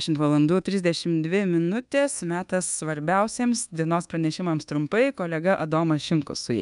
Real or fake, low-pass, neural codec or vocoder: fake; 10.8 kHz; codec, 24 kHz, 1.2 kbps, DualCodec